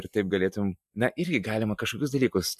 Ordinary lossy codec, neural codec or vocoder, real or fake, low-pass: MP3, 96 kbps; none; real; 14.4 kHz